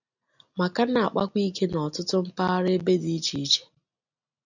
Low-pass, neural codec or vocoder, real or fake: 7.2 kHz; none; real